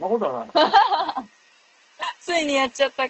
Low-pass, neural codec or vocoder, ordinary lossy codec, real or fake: 7.2 kHz; none; Opus, 16 kbps; real